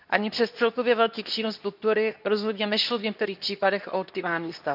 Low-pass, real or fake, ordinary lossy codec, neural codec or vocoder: 5.4 kHz; fake; none; codec, 24 kHz, 0.9 kbps, WavTokenizer, medium speech release version 2